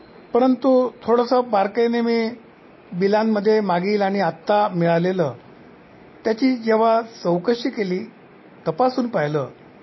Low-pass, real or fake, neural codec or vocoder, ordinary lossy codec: 7.2 kHz; real; none; MP3, 24 kbps